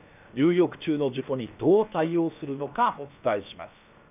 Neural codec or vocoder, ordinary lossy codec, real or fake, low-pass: codec, 16 kHz, about 1 kbps, DyCAST, with the encoder's durations; none; fake; 3.6 kHz